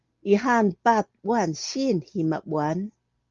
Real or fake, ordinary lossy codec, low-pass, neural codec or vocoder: real; Opus, 32 kbps; 7.2 kHz; none